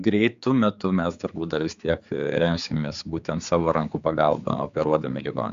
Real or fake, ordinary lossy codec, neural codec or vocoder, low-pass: fake; Opus, 64 kbps; codec, 16 kHz, 4 kbps, X-Codec, HuBERT features, trained on general audio; 7.2 kHz